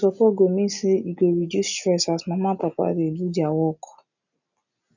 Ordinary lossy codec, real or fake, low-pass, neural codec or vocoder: none; real; 7.2 kHz; none